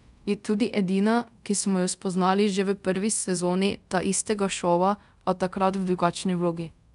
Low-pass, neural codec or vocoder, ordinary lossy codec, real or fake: 10.8 kHz; codec, 24 kHz, 0.5 kbps, DualCodec; none; fake